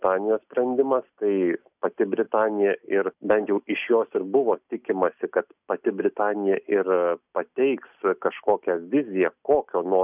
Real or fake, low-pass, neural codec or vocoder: real; 3.6 kHz; none